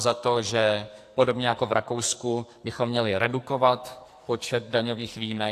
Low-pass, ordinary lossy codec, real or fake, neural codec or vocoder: 14.4 kHz; AAC, 64 kbps; fake; codec, 44.1 kHz, 2.6 kbps, SNAC